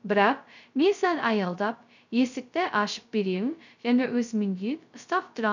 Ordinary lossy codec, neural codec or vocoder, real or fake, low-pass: none; codec, 16 kHz, 0.2 kbps, FocalCodec; fake; 7.2 kHz